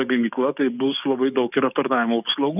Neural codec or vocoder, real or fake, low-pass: codec, 44.1 kHz, 7.8 kbps, DAC; fake; 3.6 kHz